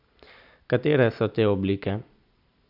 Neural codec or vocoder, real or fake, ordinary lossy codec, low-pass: none; real; none; 5.4 kHz